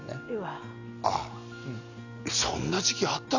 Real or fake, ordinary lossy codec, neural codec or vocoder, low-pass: real; none; none; 7.2 kHz